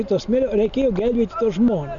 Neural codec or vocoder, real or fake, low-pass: none; real; 7.2 kHz